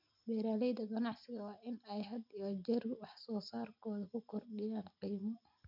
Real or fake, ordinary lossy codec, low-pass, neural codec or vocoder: real; MP3, 48 kbps; 5.4 kHz; none